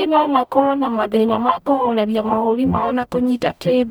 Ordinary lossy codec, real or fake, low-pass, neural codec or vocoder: none; fake; none; codec, 44.1 kHz, 0.9 kbps, DAC